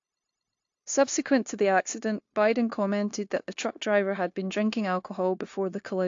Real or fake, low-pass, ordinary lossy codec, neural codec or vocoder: fake; 7.2 kHz; AAC, 48 kbps; codec, 16 kHz, 0.9 kbps, LongCat-Audio-Codec